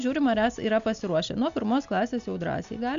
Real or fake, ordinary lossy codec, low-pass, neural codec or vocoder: real; MP3, 64 kbps; 7.2 kHz; none